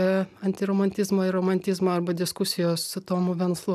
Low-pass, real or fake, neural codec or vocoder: 14.4 kHz; real; none